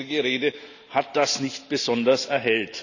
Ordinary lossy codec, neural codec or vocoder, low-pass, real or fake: none; none; 7.2 kHz; real